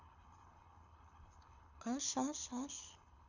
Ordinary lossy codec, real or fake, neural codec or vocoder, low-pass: none; fake; codec, 24 kHz, 6 kbps, HILCodec; 7.2 kHz